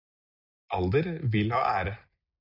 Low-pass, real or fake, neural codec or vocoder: 5.4 kHz; real; none